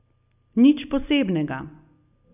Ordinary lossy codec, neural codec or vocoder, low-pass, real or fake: none; none; 3.6 kHz; real